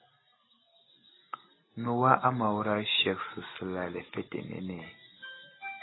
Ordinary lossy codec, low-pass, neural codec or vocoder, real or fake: AAC, 16 kbps; 7.2 kHz; none; real